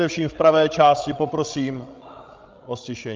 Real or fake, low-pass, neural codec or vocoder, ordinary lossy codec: fake; 7.2 kHz; codec, 16 kHz, 16 kbps, FreqCodec, larger model; Opus, 24 kbps